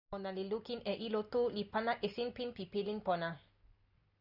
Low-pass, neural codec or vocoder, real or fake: 5.4 kHz; none; real